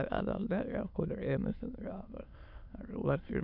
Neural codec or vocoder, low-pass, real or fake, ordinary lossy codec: autoencoder, 22.05 kHz, a latent of 192 numbers a frame, VITS, trained on many speakers; 5.4 kHz; fake; none